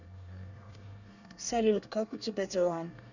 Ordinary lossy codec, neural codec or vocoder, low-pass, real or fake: none; codec, 24 kHz, 1 kbps, SNAC; 7.2 kHz; fake